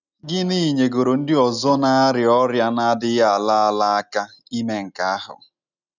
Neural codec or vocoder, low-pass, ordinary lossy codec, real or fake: none; 7.2 kHz; none; real